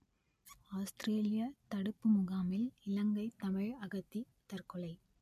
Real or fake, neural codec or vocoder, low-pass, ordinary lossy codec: real; none; 14.4 kHz; MP3, 64 kbps